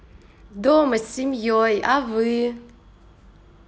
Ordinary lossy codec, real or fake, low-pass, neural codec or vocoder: none; real; none; none